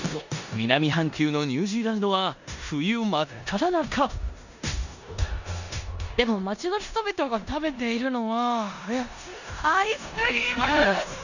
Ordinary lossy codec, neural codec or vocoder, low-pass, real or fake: none; codec, 16 kHz in and 24 kHz out, 0.9 kbps, LongCat-Audio-Codec, four codebook decoder; 7.2 kHz; fake